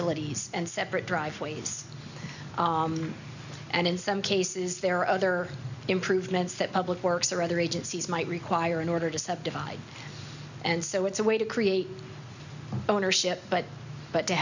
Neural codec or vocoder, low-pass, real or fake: none; 7.2 kHz; real